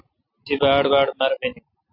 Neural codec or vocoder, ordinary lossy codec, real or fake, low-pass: none; AAC, 32 kbps; real; 5.4 kHz